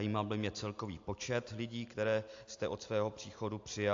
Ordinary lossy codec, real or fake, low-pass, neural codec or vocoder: AAC, 48 kbps; real; 7.2 kHz; none